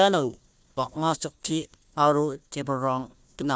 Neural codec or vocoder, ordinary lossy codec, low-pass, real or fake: codec, 16 kHz, 1 kbps, FunCodec, trained on Chinese and English, 50 frames a second; none; none; fake